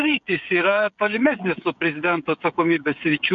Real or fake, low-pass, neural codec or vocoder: fake; 7.2 kHz; codec, 16 kHz, 16 kbps, FreqCodec, smaller model